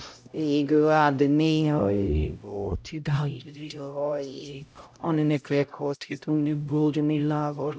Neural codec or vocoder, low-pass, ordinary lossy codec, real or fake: codec, 16 kHz, 0.5 kbps, X-Codec, HuBERT features, trained on LibriSpeech; none; none; fake